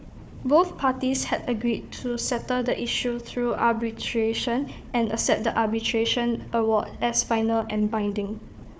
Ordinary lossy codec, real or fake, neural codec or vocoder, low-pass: none; fake; codec, 16 kHz, 4 kbps, FunCodec, trained on Chinese and English, 50 frames a second; none